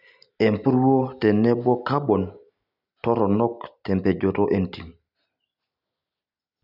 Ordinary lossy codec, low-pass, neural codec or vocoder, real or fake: none; 5.4 kHz; none; real